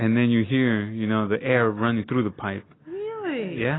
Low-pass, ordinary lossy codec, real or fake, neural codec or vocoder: 7.2 kHz; AAC, 16 kbps; real; none